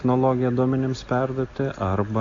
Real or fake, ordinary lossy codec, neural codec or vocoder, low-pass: real; AAC, 48 kbps; none; 7.2 kHz